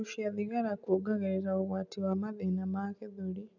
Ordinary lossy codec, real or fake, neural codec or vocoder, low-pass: none; real; none; 7.2 kHz